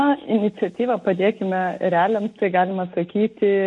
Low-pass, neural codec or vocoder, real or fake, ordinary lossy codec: 10.8 kHz; none; real; MP3, 48 kbps